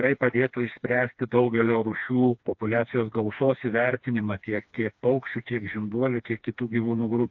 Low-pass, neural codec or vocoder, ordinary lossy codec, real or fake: 7.2 kHz; codec, 16 kHz, 2 kbps, FreqCodec, smaller model; AAC, 48 kbps; fake